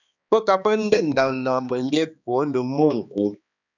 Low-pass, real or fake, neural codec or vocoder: 7.2 kHz; fake; codec, 16 kHz, 2 kbps, X-Codec, HuBERT features, trained on balanced general audio